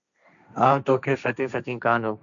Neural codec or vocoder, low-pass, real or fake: codec, 16 kHz, 1.1 kbps, Voila-Tokenizer; 7.2 kHz; fake